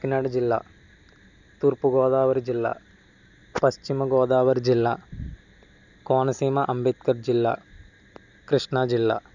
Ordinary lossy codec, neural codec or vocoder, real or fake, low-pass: none; none; real; 7.2 kHz